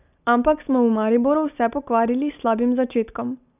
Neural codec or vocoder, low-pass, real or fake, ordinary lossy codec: none; 3.6 kHz; real; none